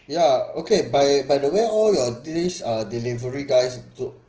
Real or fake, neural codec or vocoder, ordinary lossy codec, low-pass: real; none; Opus, 16 kbps; 7.2 kHz